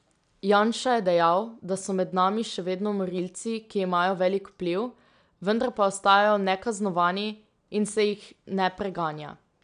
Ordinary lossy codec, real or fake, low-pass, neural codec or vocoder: none; real; 9.9 kHz; none